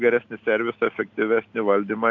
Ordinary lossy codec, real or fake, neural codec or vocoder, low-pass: AAC, 48 kbps; real; none; 7.2 kHz